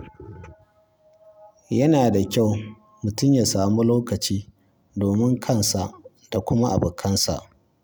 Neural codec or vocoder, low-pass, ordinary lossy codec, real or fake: none; none; none; real